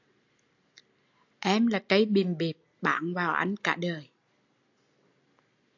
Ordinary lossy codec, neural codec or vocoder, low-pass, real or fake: AAC, 48 kbps; none; 7.2 kHz; real